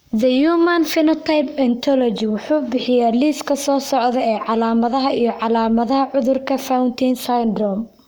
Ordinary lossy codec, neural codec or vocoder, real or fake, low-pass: none; codec, 44.1 kHz, 7.8 kbps, Pupu-Codec; fake; none